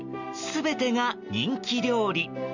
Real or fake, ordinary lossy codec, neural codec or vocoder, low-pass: real; none; none; 7.2 kHz